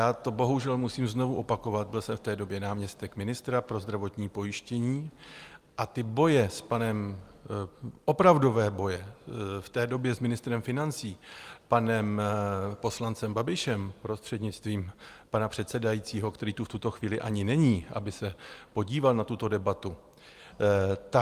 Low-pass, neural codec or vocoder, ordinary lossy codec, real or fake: 14.4 kHz; none; Opus, 32 kbps; real